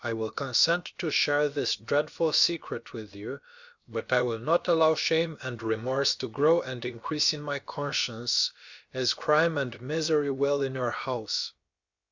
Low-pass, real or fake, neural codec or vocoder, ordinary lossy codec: 7.2 kHz; fake; codec, 16 kHz, about 1 kbps, DyCAST, with the encoder's durations; Opus, 64 kbps